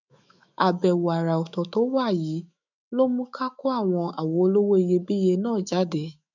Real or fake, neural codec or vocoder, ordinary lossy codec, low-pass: fake; autoencoder, 48 kHz, 128 numbers a frame, DAC-VAE, trained on Japanese speech; none; 7.2 kHz